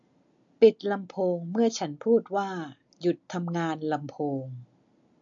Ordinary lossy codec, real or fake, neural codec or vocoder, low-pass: MP3, 48 kbps; real; none; 7.2 kHz